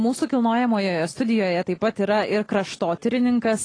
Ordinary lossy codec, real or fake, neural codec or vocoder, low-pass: AAC, 32 kbps; real; none; 9.9 kHz